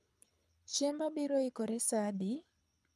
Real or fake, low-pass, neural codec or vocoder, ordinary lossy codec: fake; none; codec, 24 kHz, 6 kbps, HILCodec; none